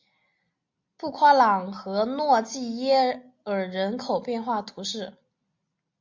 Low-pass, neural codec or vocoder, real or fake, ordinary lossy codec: 7.2 kHz; none; real; MP3, 48 kbps